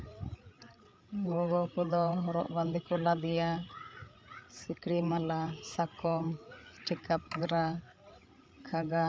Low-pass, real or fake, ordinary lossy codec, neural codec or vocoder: none; fake; none; codec, 16 kHz, 8 kbps, FreqCodec, larger model